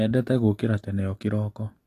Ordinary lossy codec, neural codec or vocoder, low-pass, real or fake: AAC, 48 kbps; none; 14.4 kHz; real